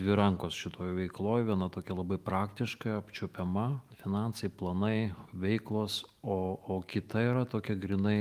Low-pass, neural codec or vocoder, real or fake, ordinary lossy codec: 14.4 kHz; autoencoder, 48 kHz, 128 numbers a frame, DAC-VAE, trained on Japanese speech; fake; Opus, 32 kbps